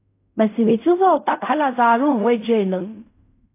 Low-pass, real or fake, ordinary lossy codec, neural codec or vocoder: 3.6 kHz; fake; AAC, 24 kbps; codec, 16 kHz in and 24 kHz out, 0.4 kbps, LongCat-Audio-Codec, fine tuned four codebook decoder